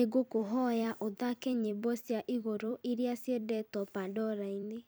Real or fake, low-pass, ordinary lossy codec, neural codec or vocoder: fake; none; none; vocoder, 44.1 kHz, 128 mel bands every 256 samples, BigVGAN v2